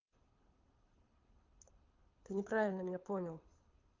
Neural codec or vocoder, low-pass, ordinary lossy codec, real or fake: codec, 24 kHz, 6 kbps, HILCodec; 7.2 kHz; Opus, 32 kbps; fake